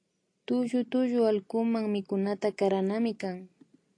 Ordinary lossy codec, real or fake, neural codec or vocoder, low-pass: AAC, 48 kbps; real; none; 9.9 kHz